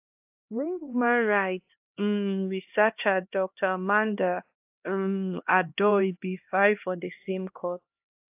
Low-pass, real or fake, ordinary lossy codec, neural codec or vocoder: 3.6 kHz; fake; AAC, 32 kbps; codec, 16 kHz, 1 kbps, X-Codec, HuBERT features, trained on LibriSpeech